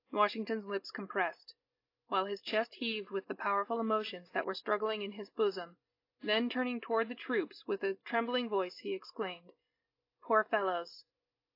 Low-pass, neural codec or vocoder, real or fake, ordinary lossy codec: 5.4 kHz; none; real; AAC, 32 kbps